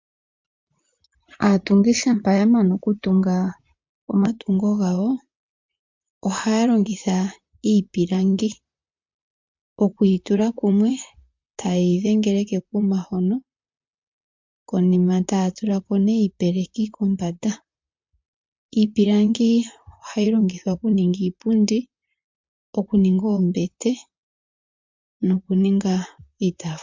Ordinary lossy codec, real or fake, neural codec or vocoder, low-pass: MP3, 64 kbps; fake; vocoder, 44.1 kHz, 128 mel bands, Pupu-Vocoder; 7.2 kHz